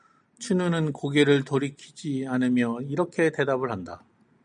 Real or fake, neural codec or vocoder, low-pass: real; none; 9.9 kHz